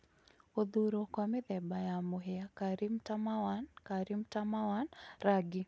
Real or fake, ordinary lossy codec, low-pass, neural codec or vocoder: real; none; none; none